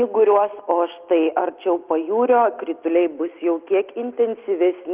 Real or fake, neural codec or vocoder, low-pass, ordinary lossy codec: real; none; 3.6 kHz; Opus, 32 kbps